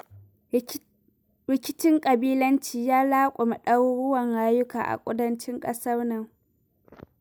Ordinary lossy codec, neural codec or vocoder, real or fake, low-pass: none; none; real; none